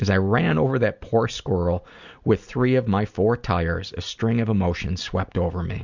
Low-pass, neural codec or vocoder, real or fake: 7.2 kHz; none; real